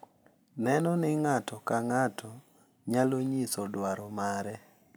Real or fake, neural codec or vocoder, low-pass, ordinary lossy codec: real; none; none; none